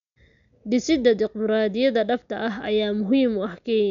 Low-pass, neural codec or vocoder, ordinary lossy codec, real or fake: 7.2 kHz; none; none; real